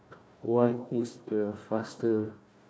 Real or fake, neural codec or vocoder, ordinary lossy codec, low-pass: fake; codec, 16 kHz, 1 kbps, FunCodec, trained on Chinese and English, 50 frames a second; none; none